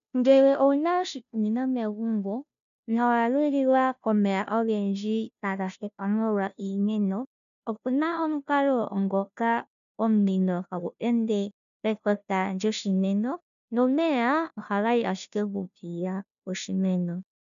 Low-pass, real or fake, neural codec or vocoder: 7.2 kHz; fake; codec, 16 kHz, 0.5 kbps, FunCodec, trained on Chinese and English, 25 frames a second